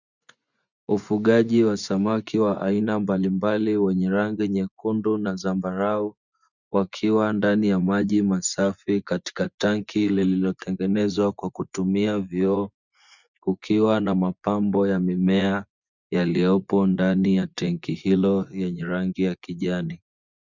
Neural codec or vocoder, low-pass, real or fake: vocoder, 24 kHz, 100 mel bands, Vocos; 7.2 kHz; fake